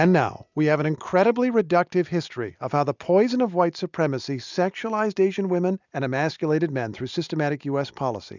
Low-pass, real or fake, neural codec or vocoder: 7.2 kHz; real; none